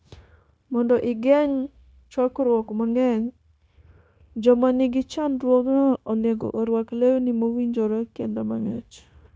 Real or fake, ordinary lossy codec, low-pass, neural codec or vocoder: fake; none; none; codec, 16 kHz, 0.9 kbps, LongCat-Audio-Codec